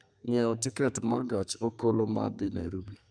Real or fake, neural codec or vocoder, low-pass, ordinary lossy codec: fake; codec, 32 kHz, 1.9 kbps, SNAC; 9.9 kHz; none